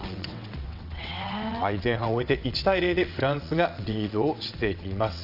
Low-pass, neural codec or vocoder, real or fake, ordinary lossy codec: 5.4 kHz; vocoder, 22.05 kHz, 80 mel bands, WaveNeXt; fake; none